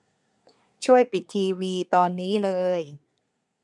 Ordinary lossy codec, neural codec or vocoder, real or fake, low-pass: none; codec, 24 kHz, 1 kbps, SNAC; fake; 10.8 kHz